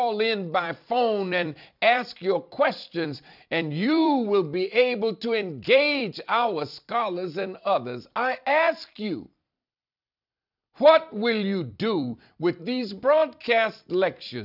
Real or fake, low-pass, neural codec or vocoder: fake; 5.4 kHz; vocoder, 44.1 kHz, 128 mel bands every 256 samples, BigVGAN v2